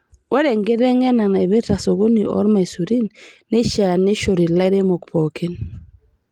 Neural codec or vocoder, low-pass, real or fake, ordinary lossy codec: none; 19.8 kHz; real; Opus, 24 kbps